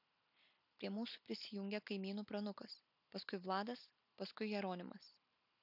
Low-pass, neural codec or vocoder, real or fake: 5.4 kHz; none; real